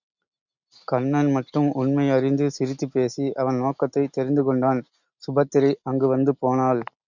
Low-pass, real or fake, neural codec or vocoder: 7.2 kHz; real; none